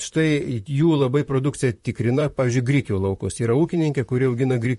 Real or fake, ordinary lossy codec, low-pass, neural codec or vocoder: fake; MP3, 48 kbps; 14.4 kHz; vocoder, 44.1 kHz, 128 mel bands, Pupu-Vocoder